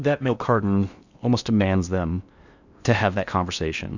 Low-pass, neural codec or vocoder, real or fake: 7.2 kHz; codec, 16 kHz in and 24 kHz out, 0.6 kbps, FocalCodec, streaming, 4096 codes; fake